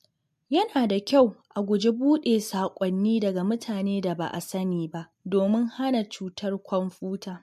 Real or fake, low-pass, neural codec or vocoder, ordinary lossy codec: real; 14.4 kHz; none; MP3, 64 kbps